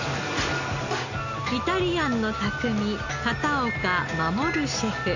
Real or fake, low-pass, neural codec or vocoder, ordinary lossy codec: real; 7.2 kHz; none; none